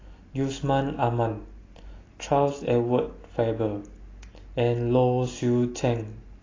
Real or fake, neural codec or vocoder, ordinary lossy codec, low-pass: real; none; AAC, 32 kbps; 7.2 kHz